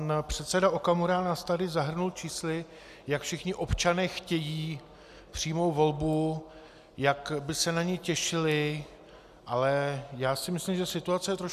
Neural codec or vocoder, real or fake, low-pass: none; real; 14.4 kHz